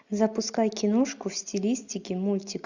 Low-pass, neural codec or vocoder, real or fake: 7.2 kHz; none; real